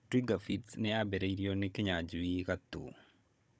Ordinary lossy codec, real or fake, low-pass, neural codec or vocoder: none; fake; none; codec, 16 kHz, 16 kbps, FunCodec, trained on Chinese and English, 50 frames a second